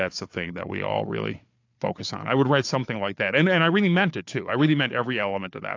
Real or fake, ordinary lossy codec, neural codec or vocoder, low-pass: fake; AAC, 48 kbps; codec, 16 kHz, 4 kbps, FunCodec, trained on Chinese and English, 50 frames a second; 7.2 kHz